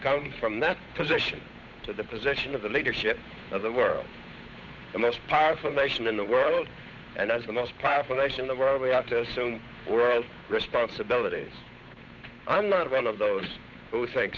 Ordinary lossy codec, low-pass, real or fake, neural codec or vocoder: Opus, 64 kbps; 7.2 kHz; fake; codec, 16 kHz, 8 kbps, FunCodec, trained on Chinese and English, 25 frames a second